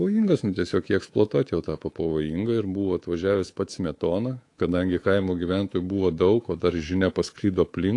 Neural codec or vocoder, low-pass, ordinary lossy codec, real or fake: codec, 24 kHz, 3.1 kbps, DualCodec; 10.8 kHz; AAC, 48 kbps; fake